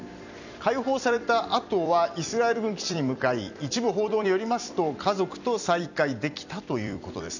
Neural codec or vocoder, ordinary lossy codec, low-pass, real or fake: vocoder, 44.1 kHz, 128 mel bands every 256 samples, BigVGAN v2; none; 7.2 kHz; fake